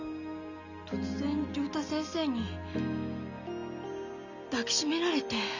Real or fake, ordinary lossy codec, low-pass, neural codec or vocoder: real; none; 7.2 kHz; none